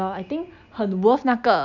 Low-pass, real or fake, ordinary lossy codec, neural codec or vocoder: 7.2 kHz; real; none; none